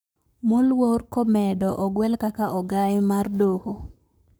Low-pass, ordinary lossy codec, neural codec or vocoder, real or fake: none; none; codec, 44.1 kHz, 7.8 kbps, Pupu-Codec; fake